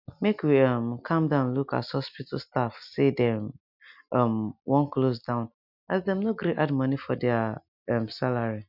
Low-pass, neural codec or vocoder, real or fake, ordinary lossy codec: 5.4 kHz; none; real; none